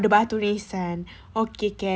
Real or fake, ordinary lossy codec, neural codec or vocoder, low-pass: real; none; none; none